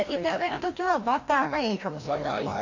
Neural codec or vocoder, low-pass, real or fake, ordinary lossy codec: codec, 16 kHz, 1 kbps, FreqCodec, larger model; 7.2 kHz; fake; AAC, 48 kbps